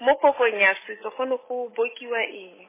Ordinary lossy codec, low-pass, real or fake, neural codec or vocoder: MP3, 16 kbps; 3.6 kHz; real; none